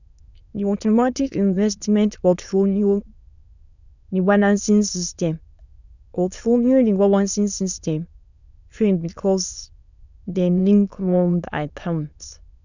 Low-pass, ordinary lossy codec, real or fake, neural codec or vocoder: 7.2 kHz; none; fake; autoencoder, 22.05 kHz, a latent of 192 numbers a frame, VITS, trained on many speakers